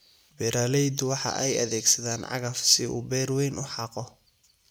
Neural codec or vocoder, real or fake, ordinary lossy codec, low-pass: none; real; none; none